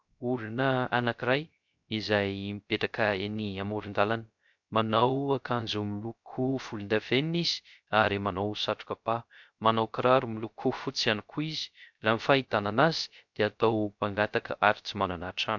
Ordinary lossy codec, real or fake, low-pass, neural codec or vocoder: MP3, 64 kbps; fake; 7.2 kHz; codec, 16 kHz, 0.3 kbps, FocalCodec